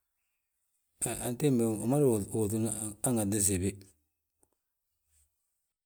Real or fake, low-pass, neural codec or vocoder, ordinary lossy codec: real; none; none; none